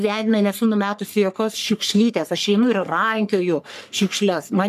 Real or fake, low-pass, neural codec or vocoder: fake; 14.4 kHz; codec, 44.1 kHz, 3.4 kbps, Pupu-Codec